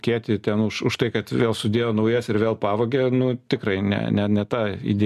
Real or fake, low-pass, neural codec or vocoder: real; 14.4 kHz; none